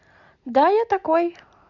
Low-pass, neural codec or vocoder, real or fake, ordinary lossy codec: 7.2 kHz; none; real; none